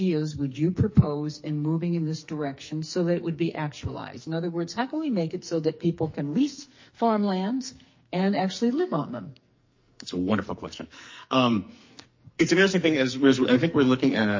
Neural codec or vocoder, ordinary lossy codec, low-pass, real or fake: codec, 44.1 kHz, 2.6 kbps, SNAC; MP3, 32 kbps; 7.2 kHz; fake